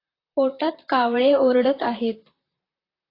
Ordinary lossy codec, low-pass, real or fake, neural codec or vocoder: AAC, 24 kbps; 5.4 kHz; fake; vocoder, 44.1 kHz, 128 mel bands, Pupu-Vocoder